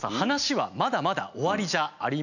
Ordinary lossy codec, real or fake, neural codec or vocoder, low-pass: none; real; none; 7.2 kHz